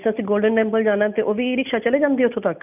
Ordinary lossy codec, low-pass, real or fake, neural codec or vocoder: none; 3.6 kHz; real; none